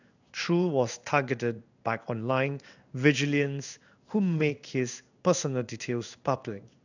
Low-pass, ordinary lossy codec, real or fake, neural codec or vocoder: 7.2 kHz; none; fake; codec, 16 kHz in and 24 kHz out, 1 kbps, XY-Tokenizer